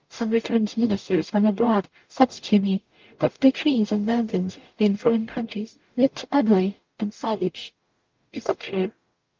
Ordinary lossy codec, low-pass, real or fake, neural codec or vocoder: Opus, 32 kbps; 7.2 kHz; fake; codec, 44.1 kHz, 0.9 kbps, DAC